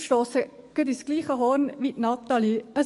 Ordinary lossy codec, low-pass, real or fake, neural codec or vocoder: MP3, 48 kbps; 14.4 kHz; fake; codec, 44.1 kHz, 7.8 kbps, DAC